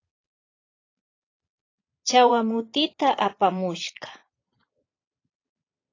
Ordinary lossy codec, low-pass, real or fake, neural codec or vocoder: AAC, 32 kbps; 7.2 kHz; fake; vocoder, 22.05 kHz, 80 mel bands, Vocos